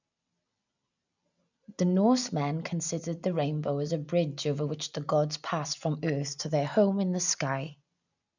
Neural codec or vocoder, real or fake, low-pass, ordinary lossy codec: none; real; 7.2 kHz; none